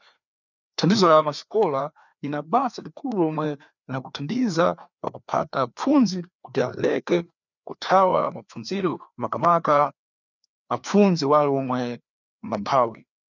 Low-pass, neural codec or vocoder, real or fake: 7.2 kHz; codec, 16 kHz, 2 kbps, FreqCodec, larger model; fake